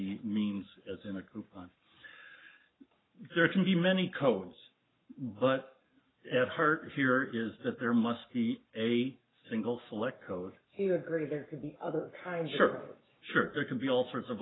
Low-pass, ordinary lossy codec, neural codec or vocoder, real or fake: 7.2 kHz; AAC, 16 kbps; codec, 44.1 kHz, 7.8 kbps, Pupu-Codec; fake